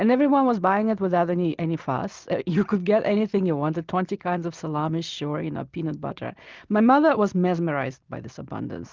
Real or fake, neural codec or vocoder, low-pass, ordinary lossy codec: real; none; 7.2 kHz; Opus, 32 kbps